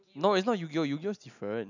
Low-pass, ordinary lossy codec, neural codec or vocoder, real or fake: 7.2 kHz; none; none; real